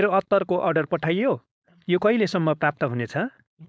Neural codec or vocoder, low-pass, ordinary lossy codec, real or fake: codec, 16 kHz, 4.8 kbps, FACodec; none; none; fake